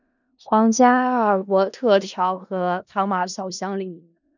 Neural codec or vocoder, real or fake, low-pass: codec, 16 kHz in and 24 kHz out, 0.4 kbps, LongCat-Audio-Codec, four codebook decoder; fake; 7.2 kHz